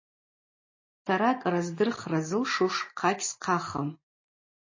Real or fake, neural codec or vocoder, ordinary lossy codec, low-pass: real; none; MP3, 32 kbps; 7.2 kHz